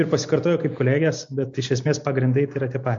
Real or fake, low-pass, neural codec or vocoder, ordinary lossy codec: real; 7.2 kHz; none; MP3, 48 kbps